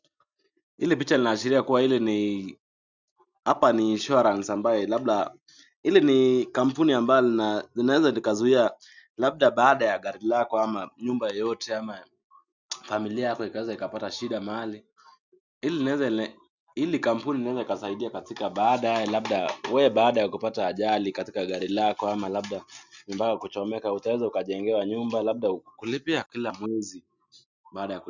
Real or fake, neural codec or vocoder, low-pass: real; none; 7.2 kHz